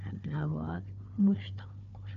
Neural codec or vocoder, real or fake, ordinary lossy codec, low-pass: codec, 16 kHz, 4 kbps, FunCodec, trained on Chinese and English, 50 frames a second; fake; none; 7.2 kHz